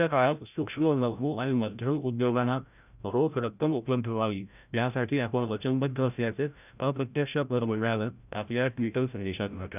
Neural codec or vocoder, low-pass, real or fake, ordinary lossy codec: codec, 16 kHz, 0.5 kbps, FreqCodec, larger model; 3.6 kHz; fake; none